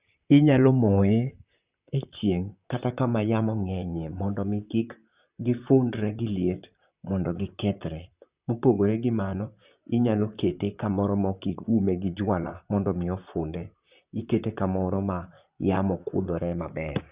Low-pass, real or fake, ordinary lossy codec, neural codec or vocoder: 3.6 kHz; fake; Opus, 64 kbps; vocoder, 44.1 kHz, 128 mel bands, Pupu-Vocoder